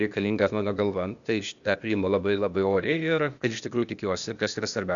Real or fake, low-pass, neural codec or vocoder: fake; 7.2 kHz; codec, 16 kHz, 0.8 kbps, ZipCodec